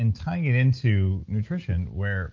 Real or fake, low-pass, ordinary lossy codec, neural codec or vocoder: real; 7.2 kHz; Opus, 24 kbps; none